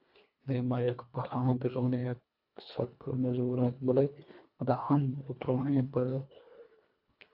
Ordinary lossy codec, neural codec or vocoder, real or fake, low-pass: AAC, 48 kbps; codec, 24 kHz, 1.5 kbps, HILCodec; fake; 5.4 kHz